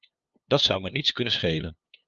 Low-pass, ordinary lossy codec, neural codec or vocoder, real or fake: 7.2 kHz; Opus, 24 kbps; codec, 16 kHz, 2 kbps, FunCodec, trained on LibriTTS, 25 frames a second; fake